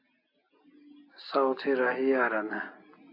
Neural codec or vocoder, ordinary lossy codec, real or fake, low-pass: none; AAC, 32 kbps; real; 5.4 kHz